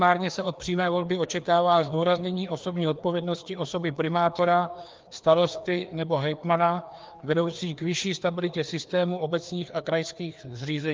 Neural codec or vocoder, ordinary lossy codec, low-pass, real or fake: codec, 16 kHz, 2 kbps, FreqCodec, larger model; Opus, 24 kbps; 7.2 kHz; fake